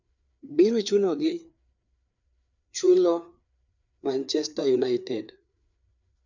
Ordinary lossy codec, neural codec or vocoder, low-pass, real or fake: none; codec, 16 kHz, 4 kbps, FreqCodec, larger model; 7.2 kHz; fake